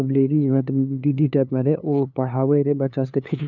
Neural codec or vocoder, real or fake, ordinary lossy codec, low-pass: codec, 16 kHz, 2 kbps, FunCodec, trained on LibriTTS, 25 frames a second; fake; none; 7.2 kHz